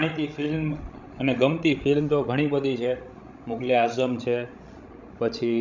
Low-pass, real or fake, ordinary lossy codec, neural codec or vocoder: 7.2 kHz; fake; none; codec, 16 kHz, 16 kbps, FreqCodec, larger model